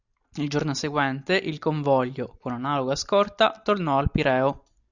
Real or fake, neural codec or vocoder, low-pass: real; none; 7.2 kHz